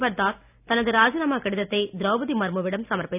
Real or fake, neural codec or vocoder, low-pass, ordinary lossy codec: real; none; 3.6 kHz; none